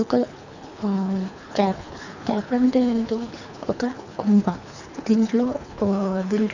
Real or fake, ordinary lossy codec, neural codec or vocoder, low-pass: fake; none; codec, 24 kHz, 3 kbps, HILCodec; 7.2 kHz